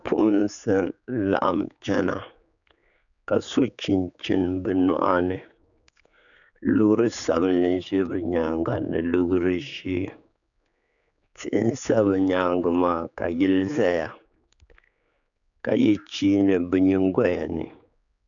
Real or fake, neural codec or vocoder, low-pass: fake; codec, 16 kHz, 4 kbps, X-Codec, HuBERT features, trained on general audio; 7.2 kHz